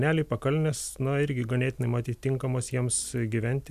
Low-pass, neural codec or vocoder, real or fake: 14.4 kHz; none; real